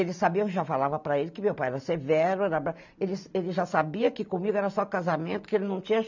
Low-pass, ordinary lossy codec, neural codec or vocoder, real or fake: 7.2 kHz; none; none; real